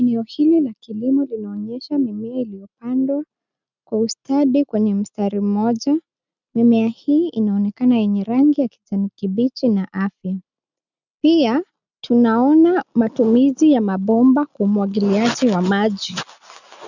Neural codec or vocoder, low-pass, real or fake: none; 7.2 kHz; real